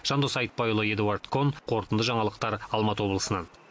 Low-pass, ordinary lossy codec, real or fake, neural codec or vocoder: none; none; real; none